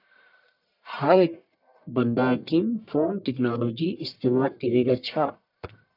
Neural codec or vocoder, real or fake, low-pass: codec, 44.1 kHz, 1.7 kbps, Pupu-Codec; fake; 5.4 kHz